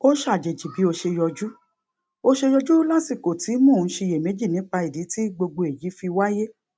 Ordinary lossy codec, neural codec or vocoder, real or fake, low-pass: none; none; real; none